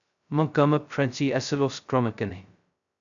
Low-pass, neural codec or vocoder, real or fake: 7.2 kHz; codec, 16 kHz, 0.2 kbps, FocalCodec; fake